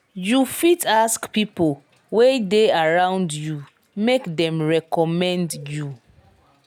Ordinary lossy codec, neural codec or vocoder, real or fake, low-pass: none; none; real; 19.8 kHz